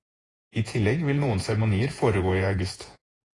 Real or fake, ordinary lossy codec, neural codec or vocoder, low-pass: fake; AAC, 32 kbps; vocoder, 48 kHz, 128 mel bands, Vocos; 10.8 kHz